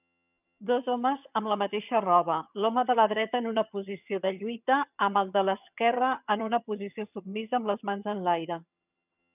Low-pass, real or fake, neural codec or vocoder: 3.6 kHz; fake; vocoder, 22.05 kHz, 80 mel bands, HiFi-GAN